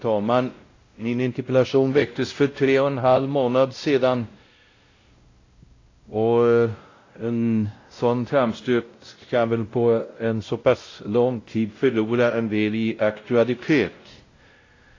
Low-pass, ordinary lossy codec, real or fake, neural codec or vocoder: 7.2 kHz; AAC, 32 kbps; fake; codec, 16 kHz, 0.5 kbps, X-Codec, WavLM features, trained on Multilingual LibriSpeech